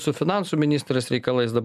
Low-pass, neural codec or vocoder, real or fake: 14.4 kHz; none; real